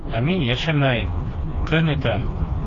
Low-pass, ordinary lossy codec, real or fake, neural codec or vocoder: 7.2 kHz; AAC, 32 kbps; fake; codec, 16 kHz, 2 kbps, FreqCodec, smaller model